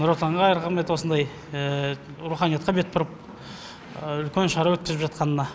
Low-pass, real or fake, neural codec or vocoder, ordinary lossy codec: none; real; none; none